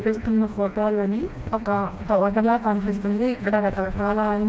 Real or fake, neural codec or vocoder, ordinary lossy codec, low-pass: fake; codec, 16 kHz, 1 kbps, FreqCodec, smaller model; none; none